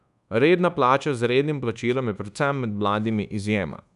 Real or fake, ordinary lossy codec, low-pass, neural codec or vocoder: fake; none; 10.8 kHz; codec, 24 kHz, 1.2 kbps, DualCodec